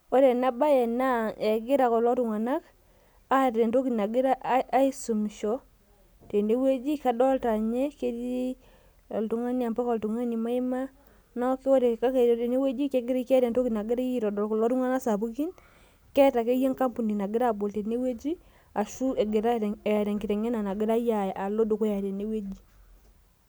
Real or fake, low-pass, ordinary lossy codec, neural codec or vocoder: real; none; none; none